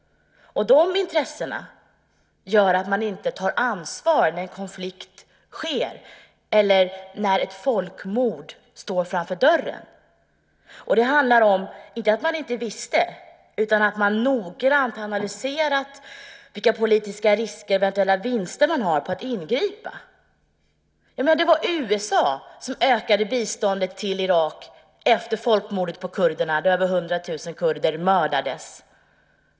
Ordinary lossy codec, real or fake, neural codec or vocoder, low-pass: none; real; none; none